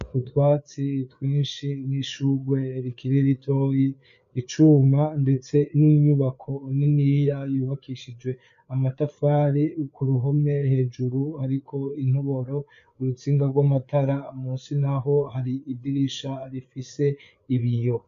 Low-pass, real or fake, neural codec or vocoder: 7.2 kHz; fake; codec, 16 kHz, 4 kbps, FreqCodec, larger model